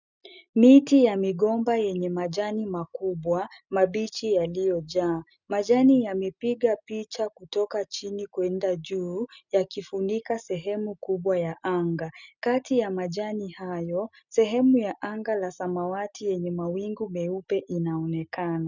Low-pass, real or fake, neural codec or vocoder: 7.2 kHz; real; none